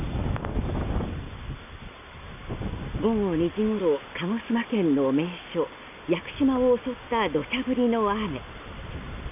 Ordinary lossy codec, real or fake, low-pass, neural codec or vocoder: none; real; 3.6 kHz; none